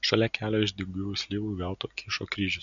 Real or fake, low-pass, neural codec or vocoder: real; 7.2 kHz; none